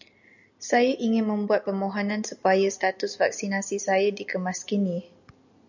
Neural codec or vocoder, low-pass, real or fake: none; 7.2 kHz; real